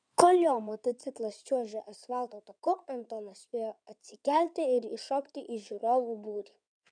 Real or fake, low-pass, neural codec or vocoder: fake; 9.9 kHz; codec, 16 kHz in and 24 kHz out, 2.2 kbps, FireRedTTS-2 codec